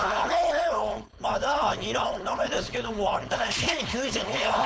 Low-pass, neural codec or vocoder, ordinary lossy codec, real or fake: none; codec, 16 kHz, 4.8 kbps, FACodec; none; fake